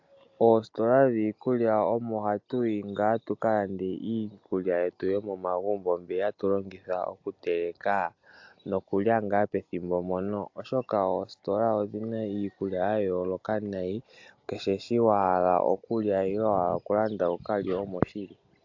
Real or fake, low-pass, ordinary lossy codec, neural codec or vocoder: real; 7.2 kHz; AAC, 48 kbps; none